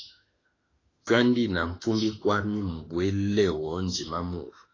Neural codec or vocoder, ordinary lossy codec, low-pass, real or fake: autoencoder, 48 kHz, 32 numbers a frame, DAC-VAE, trained on Japanese speech; AAC, 32 kbps; 7.2 kHz; fake